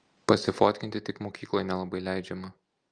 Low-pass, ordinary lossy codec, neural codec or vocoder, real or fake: 9.9 kHz; Opus, 32 kbps; none; real